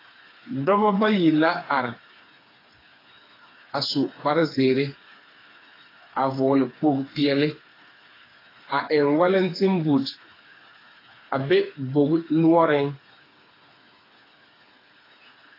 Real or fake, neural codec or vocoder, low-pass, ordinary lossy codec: fake; codec, 16 kHz, 4 kbps, FreqCodec, smaller model; 5.4 kHz; AAC, 24 kbps